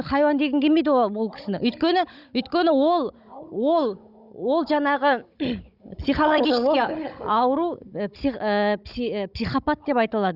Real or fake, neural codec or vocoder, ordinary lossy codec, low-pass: fake; codec, 16 kHz, 16 kbps, FunCodec, trained on Chinese and English, 50 frames a second; none; 5.4 kHz